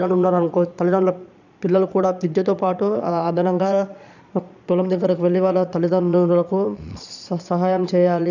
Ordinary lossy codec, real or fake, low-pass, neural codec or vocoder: none; fake; 7.2 kHz; vocoder, 22.05 kHz, 80 mel bands, Vocos